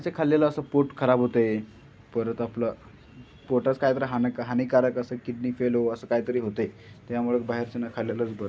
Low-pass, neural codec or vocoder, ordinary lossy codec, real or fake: none; none; none; real